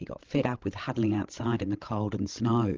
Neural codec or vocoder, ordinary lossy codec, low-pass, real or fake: codec, 16 kHz, 16 kbps, FreqCodec, larger model; Opus, 16 kbps; 7.2 kHz; fake